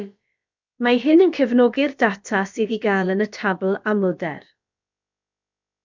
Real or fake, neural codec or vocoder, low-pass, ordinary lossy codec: fake; codec, 16 kHz, about 1 kbps, DyCAST, with the encoder's durations; 7.2 kHz; MP3, 64 kbps